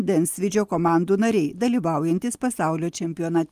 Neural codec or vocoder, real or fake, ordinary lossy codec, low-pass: none; real; Opus, 24 kbps; 14.4 kHz